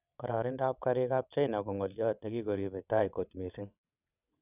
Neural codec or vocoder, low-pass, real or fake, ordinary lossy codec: vocoder, 22.05 kHz, 80 mel bands, WaveNeXt; 3.6 kHz; fake; none